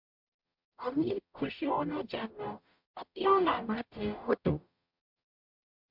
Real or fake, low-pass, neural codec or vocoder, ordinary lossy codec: fake; 5.4 kHz; codec, 44.1 kHz, 0.9 kbps, DAC; MP3, 48 kbps